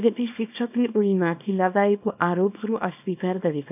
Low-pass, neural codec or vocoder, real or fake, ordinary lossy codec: 3.6 kHz; codec, 24 kHz, 0.9 kbps, WavTokenizer, small release; fake; none